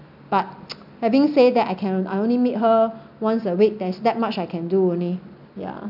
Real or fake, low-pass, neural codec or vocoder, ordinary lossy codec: real; 5.4 kHz; none; none